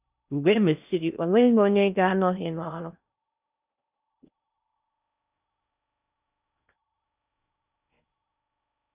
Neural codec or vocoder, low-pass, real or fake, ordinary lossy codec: codec, 16 kHz in and 24 kHz out, 0.6 kbps, FocalCodec, streaming, 4096 codes; 3.6 kHz; fake; none